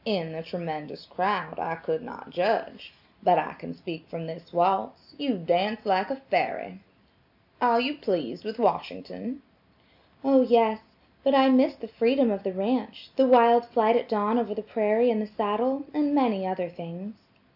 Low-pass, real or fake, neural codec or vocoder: 5.4 kHz; real; none